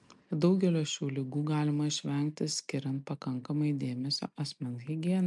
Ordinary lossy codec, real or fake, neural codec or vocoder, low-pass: MP3, 64 kbps; real; none; 10.8 kHz